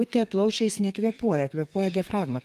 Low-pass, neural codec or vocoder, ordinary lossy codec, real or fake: 14.4 kHz; codec, 32 kHz, 1.9 kbps, SNAC; Opus, 32 kbps; fake